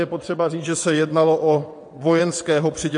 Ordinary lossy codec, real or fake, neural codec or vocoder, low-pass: MP3, 48 kbps; fake; vocoder, 22.05 kHz, 80 mel bands, WaveNeXt; 9.9 kHz